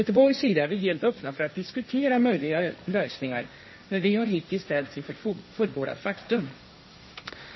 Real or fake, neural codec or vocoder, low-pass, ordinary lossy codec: fake; codec, 16 kHz, 1.1 kbps, Voila-Tokenizer; 7.2 kHz; MP3, 24 kbps